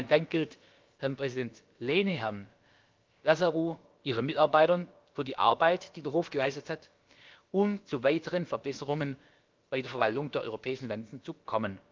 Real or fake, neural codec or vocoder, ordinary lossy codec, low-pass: fake; codec, 16 kHz, about 1 kbps, DyCAST, with the encoder's durations; Opus, 32 kbps; 7.2 kHz